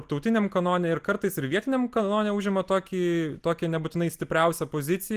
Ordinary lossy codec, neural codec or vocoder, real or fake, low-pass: Opus, 24 kbps; none; real; 14.4 kHz